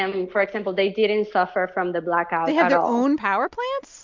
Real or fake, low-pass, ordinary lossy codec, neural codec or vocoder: real; 7.2 kHz; MP3, 64 kbps; none